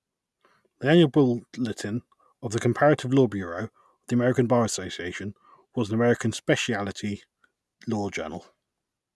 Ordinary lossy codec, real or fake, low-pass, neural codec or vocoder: none; real; none; none